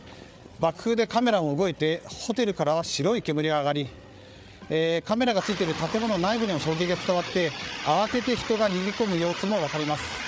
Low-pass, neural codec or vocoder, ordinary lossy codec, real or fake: none; codec, 16 kHz, 8 kbps, FreqCodec, larger model; none; fake